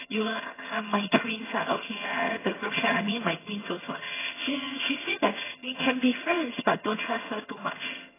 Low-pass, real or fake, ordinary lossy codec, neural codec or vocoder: 3.6 kHz; fake; AAC, 16 kbps; vocoder, 22.05 kHz, 80 mel bands, HiFi-GAN